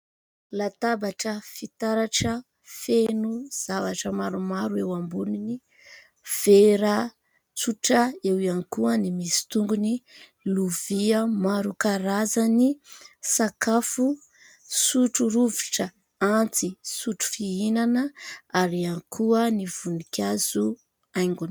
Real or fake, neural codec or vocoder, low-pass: real; none; 19.8 kHz